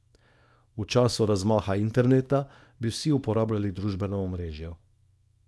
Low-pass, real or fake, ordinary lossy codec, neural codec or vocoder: none; fake; none; codec, 24 kHz, 0.9 kbps, WavTokenizer, small release